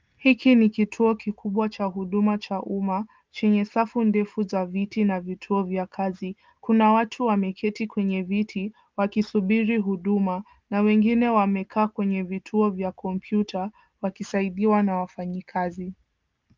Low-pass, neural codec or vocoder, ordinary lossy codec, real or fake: 7.2 kHz; none; Opus, 32 kbps; real